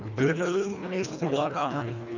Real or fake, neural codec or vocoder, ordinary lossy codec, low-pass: fake; codec, 24 kHz, 1.5 kbps, HILCodec; none; 7.2 kHz